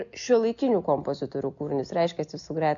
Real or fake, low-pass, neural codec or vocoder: real; 7.2 kHz; none